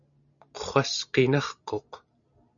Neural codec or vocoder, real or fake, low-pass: none; real; 7.2 kHz